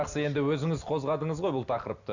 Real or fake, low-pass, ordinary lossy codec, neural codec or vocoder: real; 7.2 kHz; none; none